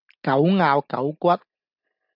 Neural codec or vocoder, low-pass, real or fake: none; 5.4 kHz; real